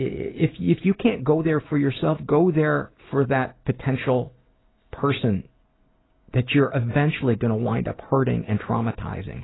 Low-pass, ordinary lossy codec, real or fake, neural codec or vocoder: 7.2 kHz; AAC, 16 kbps; real; none